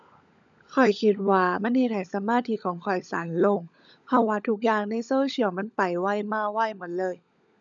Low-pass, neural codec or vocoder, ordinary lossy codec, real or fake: 7.2 kHz; codec, 16 kHz, 16 kbps, FunCodec, trained on LibriTTS, 50 frames a second; none; fake